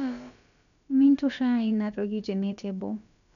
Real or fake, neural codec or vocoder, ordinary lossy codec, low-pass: fake; codec, 16 kHz, about 1 kbps, DyCAST, with the encoder's durations; none; 7.2 kHz